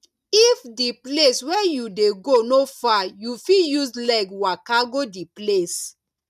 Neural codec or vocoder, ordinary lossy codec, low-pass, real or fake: none; none; 14.4 kHz; real